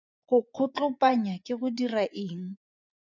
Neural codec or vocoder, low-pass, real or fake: vocoder, 24 kHz, 100 mel bands, Vocos; 7.2 kHz; fake